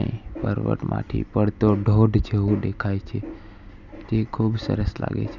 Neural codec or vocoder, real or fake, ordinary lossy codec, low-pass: none; real; none; 7.2 kHz